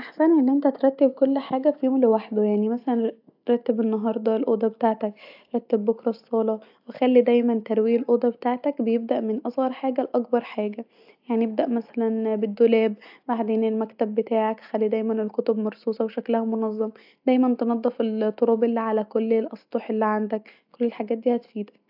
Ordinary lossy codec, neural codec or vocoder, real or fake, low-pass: AAC, 48 kbps; none; real; 5.4 kHz